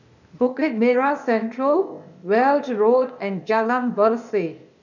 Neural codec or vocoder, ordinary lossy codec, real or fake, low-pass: codec, 16 kHz, 0.8 kbps, ZipCodec; none; fake; 7.2 kHz